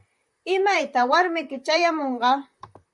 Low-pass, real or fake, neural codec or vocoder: 10.8 kHz; fake; vocoder, 44.1 kHz, 128 mel bands, Pupu-Vocoder